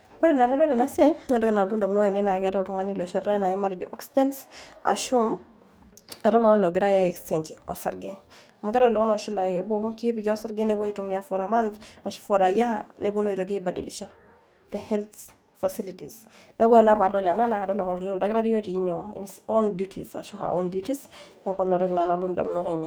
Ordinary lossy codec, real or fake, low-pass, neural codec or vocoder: none; fake; none; codec, 44.1 kHz, 2.6 kbps, DAC